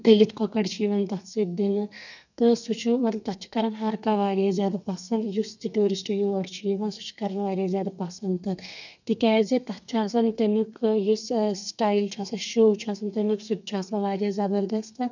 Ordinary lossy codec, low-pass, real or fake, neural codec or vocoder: none; 7.2 kHz; fake; codec, 32 kHz, 1.9 kbps, SNAC